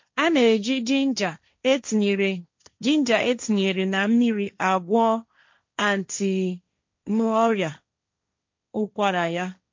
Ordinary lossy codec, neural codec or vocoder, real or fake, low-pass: MP3, 48 kbps; codec, 16 kHz, 1.1 kbps, Voila-Tokenizer; fake; 7.2 kHz